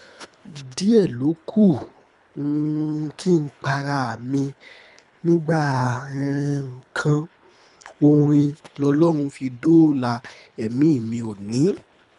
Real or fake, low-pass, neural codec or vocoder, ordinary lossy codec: fake; 10.8 kHz; codec, 24 kHz, 3 kbps, HILCodec; none